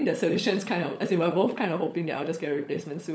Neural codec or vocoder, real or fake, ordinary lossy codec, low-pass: codec, 16 kHz, 16 kbps, FunCodec, trained on LibriTTS, 50 frames a second; fake; none; none